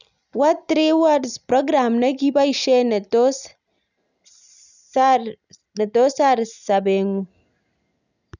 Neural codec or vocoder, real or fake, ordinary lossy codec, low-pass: none; real; none; 7.2 kHz